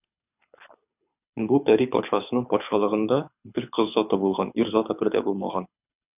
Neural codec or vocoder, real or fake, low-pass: codec, 24 kHz, 6 kbps, HILCodec; fake; 3.6 kHz